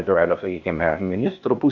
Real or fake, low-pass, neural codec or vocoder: fake; 7.2 kHz; codec, 16 kHz, 0.8 kbps, ZipCodec